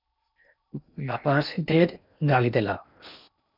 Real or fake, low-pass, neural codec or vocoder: fake; 5.4 kHz; codec, 16 kHz in and 24 kHz out, 0.6 kbps, FocalCodec, streaming, 2048 codes